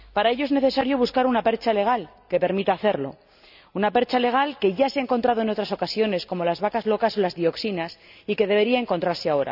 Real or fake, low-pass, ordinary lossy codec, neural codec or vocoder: real; 5.4 kHz; none; none